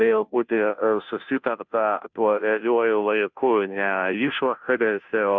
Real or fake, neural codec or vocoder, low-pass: fake; codec, 16 kHz, 0.5 kbps, FunCodec, trained on Chinese and English, 25 frames a second; 7.2 kHz